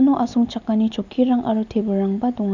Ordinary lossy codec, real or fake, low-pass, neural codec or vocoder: none; real; 7.2 kHz; none